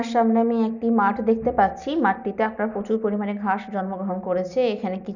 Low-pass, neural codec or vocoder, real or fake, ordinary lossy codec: 7.2 kHz; none; real; none